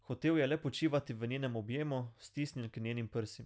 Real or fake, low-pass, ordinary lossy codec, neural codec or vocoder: real; none; none; none